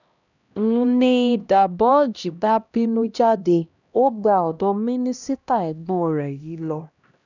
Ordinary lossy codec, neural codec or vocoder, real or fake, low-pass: none; codec, 16 kHz, 1 kbps, X-Codec, HuBERT features, trained on LibriSpeech; fake; 7.2 kHz